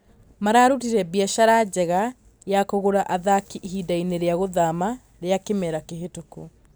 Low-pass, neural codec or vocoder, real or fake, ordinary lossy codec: none; none; real; none